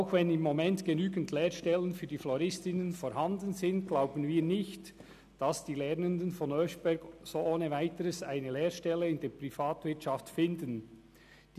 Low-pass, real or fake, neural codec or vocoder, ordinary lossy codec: 14.4 kHz; real; none; none